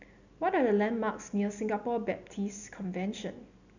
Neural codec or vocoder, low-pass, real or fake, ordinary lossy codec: none; 7.2 kHz; real; none